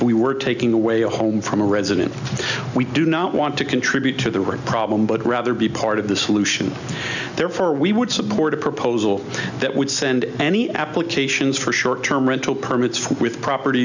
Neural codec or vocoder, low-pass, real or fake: none; 7.2 kHz; real